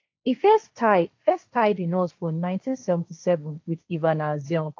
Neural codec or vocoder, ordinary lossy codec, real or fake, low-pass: codec, 16 kHz, 1.1 kbps, Voila-Tokenizer; AAC, 48 kbps; fake; 7.2 kHz